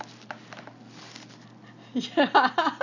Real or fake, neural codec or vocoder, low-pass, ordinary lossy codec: real; none; 7.2 kHz; none